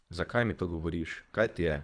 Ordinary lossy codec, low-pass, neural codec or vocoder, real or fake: none; 9.9 kHz; codec, 24 kHz, 3 kbps, HILCodec; fake